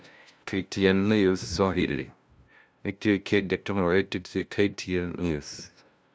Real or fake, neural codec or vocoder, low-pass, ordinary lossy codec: fake; codec, 16 kHz, 0.5 kbps, FunCodec, trained on LibriTTS, 25 frames a second; none; none